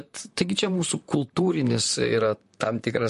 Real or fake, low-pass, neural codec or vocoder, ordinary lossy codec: fake; 14.4 kHz; vocoder, 44.1 kHz, 128 mel bands, Pupu-Vocoder; MP3, 48 kbps